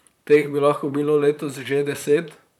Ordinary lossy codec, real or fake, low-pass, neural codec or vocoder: none; fake; 19.8 kHz; vocoder, 44.1 kHz, 128 mel bands, Pupu-Vocoder